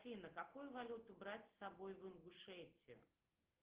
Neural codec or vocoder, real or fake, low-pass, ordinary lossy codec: none; real; 3.6 kHz; Opus, 16 kbps